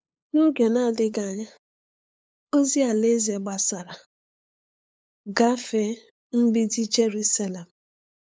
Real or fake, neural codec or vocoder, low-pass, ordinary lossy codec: fake; codec, 16 kHz, 8 kbps, FunCodec, trained on LibriTTS, 25 frames a second; none; none